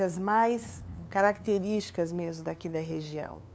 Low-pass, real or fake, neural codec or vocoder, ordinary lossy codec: none; fake; codec, 16 kHz, 2 kbps, FunCodec, trained on LibriTTS, 25 frames a second; none